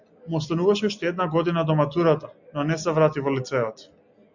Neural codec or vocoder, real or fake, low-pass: none; real; 7.2 kHz